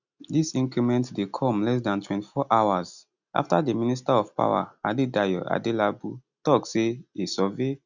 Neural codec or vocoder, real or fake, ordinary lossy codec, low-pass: none; real; none; 7.2 kHz